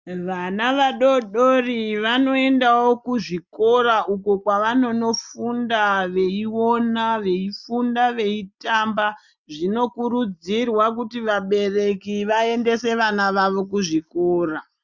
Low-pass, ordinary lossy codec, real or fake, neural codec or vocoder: 7.2 kHz; Opus, 64 kbps; real; none